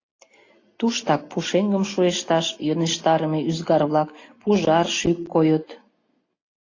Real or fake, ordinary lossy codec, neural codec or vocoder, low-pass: real; AAC, 32 kbps; none; 7.2 kHz